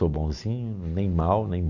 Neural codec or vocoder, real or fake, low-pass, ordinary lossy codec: none; real; 7.2 kHz; none